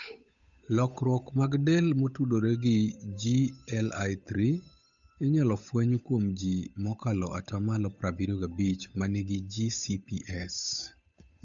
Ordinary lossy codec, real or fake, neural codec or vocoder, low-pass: none; fake; codec, 16 kHz, 8 kbps, FunCodec, trained on Chinese and English, 25 frames a second; 7.2 kHz